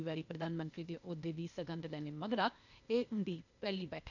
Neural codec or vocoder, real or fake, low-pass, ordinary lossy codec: codec, 16 kHz, 0.8 kbps, ZipCodec; fake; 7.2 kHz; none